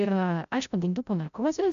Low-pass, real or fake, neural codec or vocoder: 7.2 kHz; fake; codec, 16 kHz, 0.5 kbps, FreqCodec, larger model